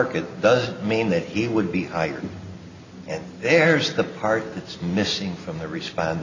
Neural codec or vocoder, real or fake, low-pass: none; real; 7.2 kHz